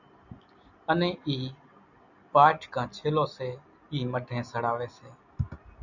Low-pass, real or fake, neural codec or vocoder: 7.2 kHz; real; none